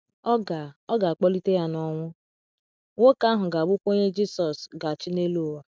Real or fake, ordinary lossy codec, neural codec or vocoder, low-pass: real; none; none; none